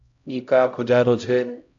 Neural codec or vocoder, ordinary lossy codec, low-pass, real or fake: codec, 16 kHz, 0.5 kbps, X-Codec, HuBERT features, trained on LibriSpeech; AAC, 64 kbps; 7.2 kHz; fake